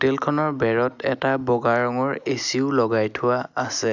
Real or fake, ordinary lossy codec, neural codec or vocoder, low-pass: real; none; none; 7.2 kHz